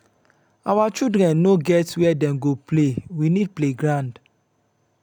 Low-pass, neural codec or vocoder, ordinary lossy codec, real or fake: 19.8 kHz; none; none; real